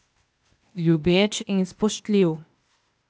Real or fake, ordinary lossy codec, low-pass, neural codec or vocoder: fake; none; none; codec, 16 kHz, 0.8 kbps, ZipCodec